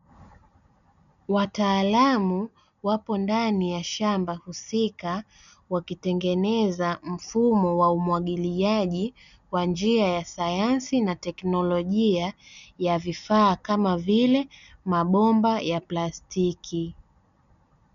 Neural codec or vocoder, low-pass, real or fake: none; 7.2 kHz; real